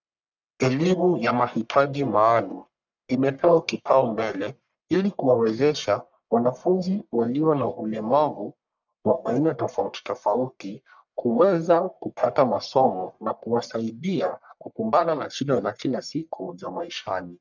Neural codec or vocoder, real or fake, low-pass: codec, 44.1 kHz, 1.7 kbps, Pupu-Codec; fake; 7.2 kHz